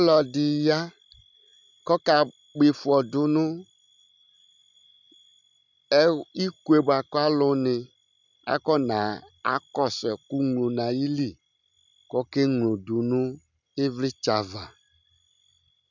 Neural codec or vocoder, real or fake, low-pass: none; real; 7.2 kHz